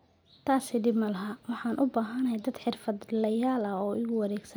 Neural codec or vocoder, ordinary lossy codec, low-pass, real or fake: none; none; none; real